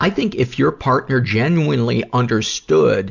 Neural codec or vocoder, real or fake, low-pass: none; real; 7.2 kHz